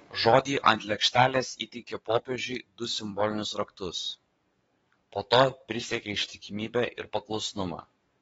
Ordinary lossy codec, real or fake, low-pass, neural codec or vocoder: AAC, 24 kbps; fake; 19.8 kHz; codec, 44.1 kHz, 7.8 kbps, DAC